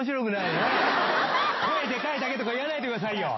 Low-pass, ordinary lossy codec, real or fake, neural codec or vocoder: 7.2 kHz; MP3, 24 kbps; real; none